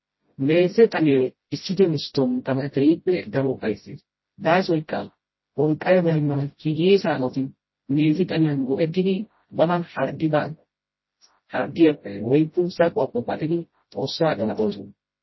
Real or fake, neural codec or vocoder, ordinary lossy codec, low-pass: fake; codec, 16 kHz, 0.5 kbps, FreqCodec, smaller model; MP3, 24 kbps; 7.2 kHz